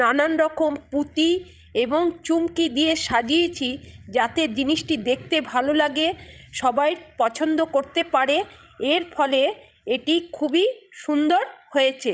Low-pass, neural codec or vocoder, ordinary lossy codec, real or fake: none; codec, 16 kHz, 16 kbps, FreqCodec, larger model; none; fake